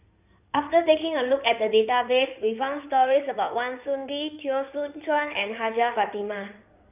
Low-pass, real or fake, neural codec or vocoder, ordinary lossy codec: 3.6 kHz; fake; codec, 16 kHz in and 24 kHz out, 2.2 kbps, FireRedTTS-2 codec; none